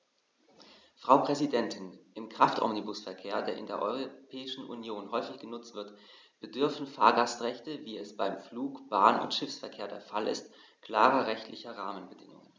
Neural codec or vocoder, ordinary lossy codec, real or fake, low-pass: none; none; real; none